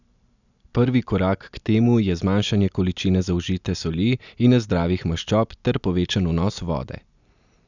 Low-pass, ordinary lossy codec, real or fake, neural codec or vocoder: 7.2 kHz; none; real; none